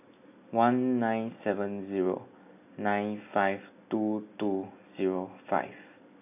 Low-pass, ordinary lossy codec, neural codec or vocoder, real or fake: 3.6 kHz; none; none; real